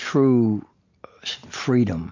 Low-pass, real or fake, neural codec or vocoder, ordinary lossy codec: 7.2 kHz; real; none; MP3, 48 kbps